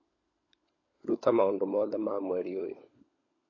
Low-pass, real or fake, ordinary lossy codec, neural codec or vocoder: 7.2 kHz; fake; MP3, 32 kbps; codec, 16 kHz, 16 kbps, FunCodec, trained on LibriTTS, 50 frames a second